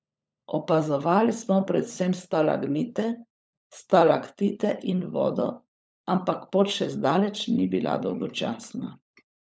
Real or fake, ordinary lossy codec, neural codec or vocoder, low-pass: fake; none; codec, 16 kHz, 16 kbps, FunCodec, trained on LibriTTS, 50 frames a second; none